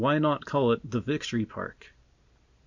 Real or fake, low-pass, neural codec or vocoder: real; 7.2 kHz; none